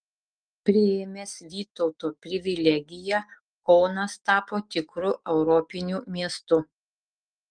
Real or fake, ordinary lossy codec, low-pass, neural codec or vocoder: fake; Opus, 32 kbps; 9.9 kHz; autoencoder, 48 kHz, 128 numbers a frame, DAC-VAE, trained on Japanese speech